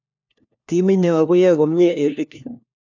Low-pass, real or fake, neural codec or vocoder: 7.2 kHz; fake; codec, 16 kHz, 1 kbps, FunCodec, trained on LibriTTS, 50 frames a second